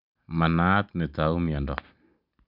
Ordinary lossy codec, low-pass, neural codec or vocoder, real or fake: none; 5.4 kHz; none; real